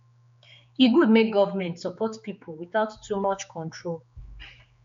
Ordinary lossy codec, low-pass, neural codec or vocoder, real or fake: MP3, 48 kbps; 7.2 kHz; codec, 16 kHz, 4 kbps, X-Codec, HuBERT features, trained on general audio; fake